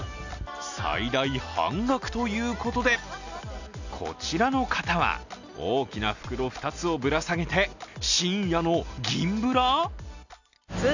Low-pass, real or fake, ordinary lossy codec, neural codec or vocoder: 7.2 kHz; real; none; none